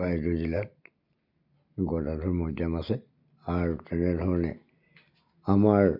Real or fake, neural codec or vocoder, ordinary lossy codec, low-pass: real; none; none; 5.4 kHz